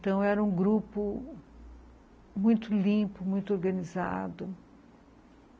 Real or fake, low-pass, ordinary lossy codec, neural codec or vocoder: real; none; none; none